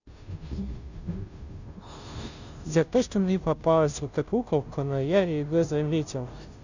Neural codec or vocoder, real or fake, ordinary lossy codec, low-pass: codec, 16 kHz, 0.5 kbps, FunCodec, trained on Chinese and English, 25 frames a second; fake; none; 7.2 kHz